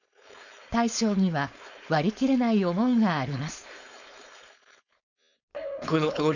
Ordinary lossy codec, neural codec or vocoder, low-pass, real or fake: none; codec, 16 kHz, 4.8 kbps, FACodec; 7.2 kHz; fake